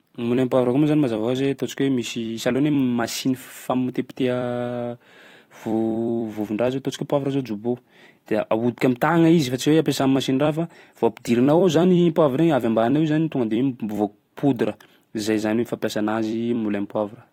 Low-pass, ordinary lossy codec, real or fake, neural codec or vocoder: 19.8 kHz; AAC, 48 kbps; fake; vocoder, 44.1 kHz, 128 mel bands every 256 samples, BigVGAN v2